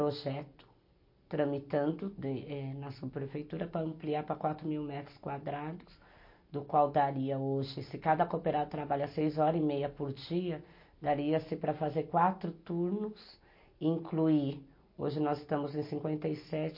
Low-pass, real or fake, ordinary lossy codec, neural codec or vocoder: 5.4 kHz; real; MP3, 32 kbps; none